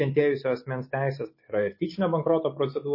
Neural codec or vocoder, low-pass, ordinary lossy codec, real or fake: none; 5.4 kHz; MP3, 24 kbps; real